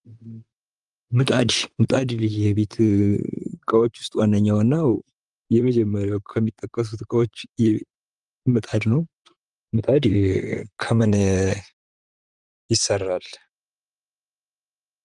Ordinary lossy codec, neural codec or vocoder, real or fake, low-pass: Opus, 32 kbps; vocoder, 44.1 kHz, 128 mel bands, Pupu-Vocoder; fake; 10.8 kHz